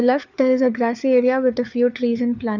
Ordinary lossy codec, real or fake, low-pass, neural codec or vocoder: none; fake; 7.2 kHz; codec, 16 kHz, 4 kbps, FunCodec, trained on LibriTTS, 50 frames a second